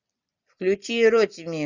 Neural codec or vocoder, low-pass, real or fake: none; 7.2 kHz; real